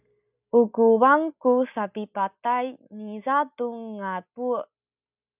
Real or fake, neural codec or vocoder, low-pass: real; none; 3.6 kHz